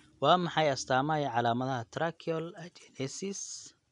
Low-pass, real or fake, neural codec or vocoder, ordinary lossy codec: 10.8 kHz; real; none; none